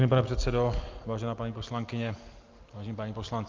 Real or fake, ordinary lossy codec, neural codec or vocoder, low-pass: real; Opus, 24 kbps; none; 7.2 kHz